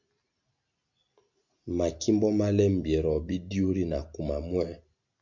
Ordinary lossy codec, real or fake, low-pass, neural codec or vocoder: MP3, 48 kbps; real; 7.2 kHz; none